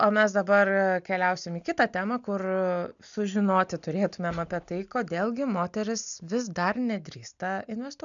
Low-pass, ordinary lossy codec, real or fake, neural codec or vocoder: 7.2 kHz; MP3, 96 kbps; real; none